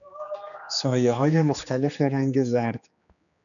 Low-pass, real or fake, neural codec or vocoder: 7.2 kHz; fake; codec, 16 kHz, 2 kbps, X-Codec, HuBERT features, trained on general audio